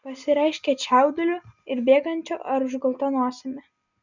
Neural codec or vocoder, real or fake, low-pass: none; real; 7.2 kHz